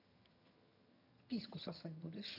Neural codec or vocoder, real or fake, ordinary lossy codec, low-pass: vocoder, 22.05 kHz, 80 mel bands, HiFi-GAN; fake; none; 5.4 kHz